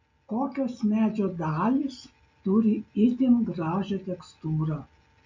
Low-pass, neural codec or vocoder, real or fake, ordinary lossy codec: 7.2 kHz; none; real; MP3, 48 kbps